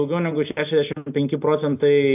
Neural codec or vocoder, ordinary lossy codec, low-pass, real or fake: none; AAC, 32 kbps; 3.6 kHz; real